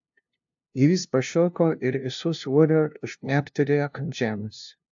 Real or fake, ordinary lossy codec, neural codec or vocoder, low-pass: fake; AAC, 64 kbps; codec, 16 kHz, 0.5 kbps, FunCodec, trained on LibriTTS, 25 frames a second; 7.2 kHz